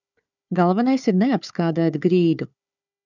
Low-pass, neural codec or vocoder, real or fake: 7.2 kHz; codec, 16 kHz, 4 kbps, FunCodec, trained on Chinese and English, 50 frames a second; fake